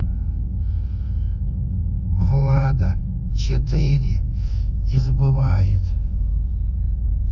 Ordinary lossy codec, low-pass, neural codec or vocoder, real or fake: none; 7.2 kHz; codec, 24 kHz, 1.2 kbps, DualCodec; fake